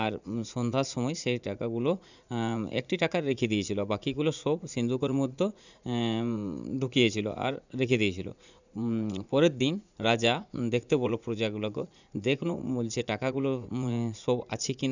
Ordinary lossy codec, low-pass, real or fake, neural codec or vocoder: none; 7.2 kHz; fake; vocoder, 44.1 kHz, 80 mel bands, Vocos